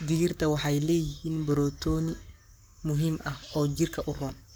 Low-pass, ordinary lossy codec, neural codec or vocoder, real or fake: none; none; codec, 44.1 kHz, 7.8 kbps, Pupu-Codec; fake